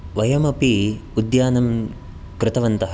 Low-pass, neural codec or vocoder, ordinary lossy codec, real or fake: none; none; none; real